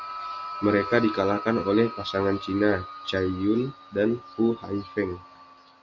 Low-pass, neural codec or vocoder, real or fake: 7.2 kHz; none; real